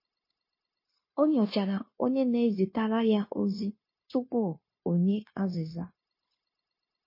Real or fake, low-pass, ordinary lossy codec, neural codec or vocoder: fake; 5.4 kHz; MP3, 24 kbps; codec, 16 kHz, 0.9 kbps, LongCat-Audio-Codec